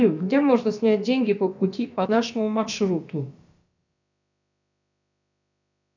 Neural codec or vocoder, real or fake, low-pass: codec, 16 kHz, about 1 kbps, DyCAST, with the encoder's durations; fake; 7.2 kHz